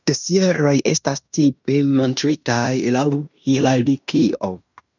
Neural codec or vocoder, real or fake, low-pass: codec, 16 kHz in and 24 kHz out, 0.9 kbps, LongCat-Audio-Codec, fine tuned four codebook decoder; fake; 7.2 kHz